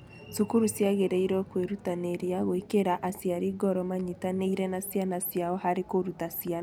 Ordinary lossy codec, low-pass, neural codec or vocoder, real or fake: none; none; none; real